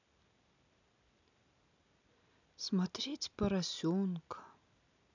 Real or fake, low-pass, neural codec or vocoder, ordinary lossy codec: real; 7.2 kHz; none; none